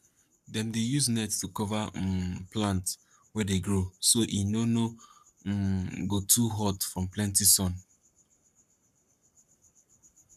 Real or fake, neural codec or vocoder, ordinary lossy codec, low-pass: fake; codec, 44.1 kHz, 7.8 kbps, Pupu-Codec; none; 14.4 kHz